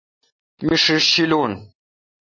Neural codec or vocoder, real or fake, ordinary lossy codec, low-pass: none; real; MP3, 32 kbps; 7.2 kHz